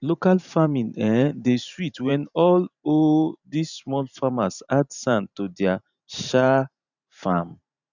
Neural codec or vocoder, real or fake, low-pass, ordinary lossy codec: vocoder, 44.1 kHz, 128 mel bands every 512 samples, BigVGAN v2; fake; 7.2 kHz; none